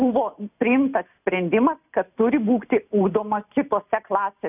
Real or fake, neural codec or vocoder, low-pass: real; none; 3.6 kHz